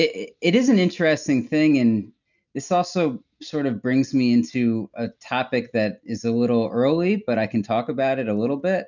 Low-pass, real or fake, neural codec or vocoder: 7.2 kHz; real; none